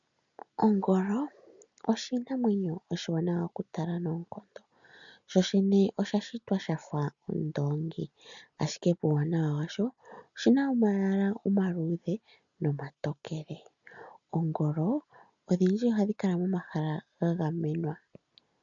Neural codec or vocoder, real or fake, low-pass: none; real; 7.2 kHz